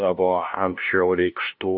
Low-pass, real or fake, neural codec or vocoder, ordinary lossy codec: 5.4 kHz; fake; codec, 16 kHz, 0.5 kbps, FunCodec, trained on LibriTTS, 25 frames a second; AAC, 48 kbps